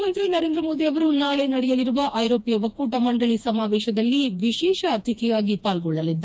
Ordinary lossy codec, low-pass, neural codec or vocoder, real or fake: none; none; codec, 16 kHz, 2 kbps, FreqCodec, smaller model; fake